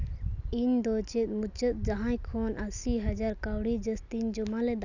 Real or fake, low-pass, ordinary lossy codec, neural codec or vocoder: real; 7.2 kHz; none; none